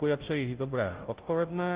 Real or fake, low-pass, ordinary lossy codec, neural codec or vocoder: fake; 3.6 kHz; Opus, 16 kbps; codec, 16 kHz, 0.5 kbps, FunCodec, trained on Chinese and English, 25 frames a second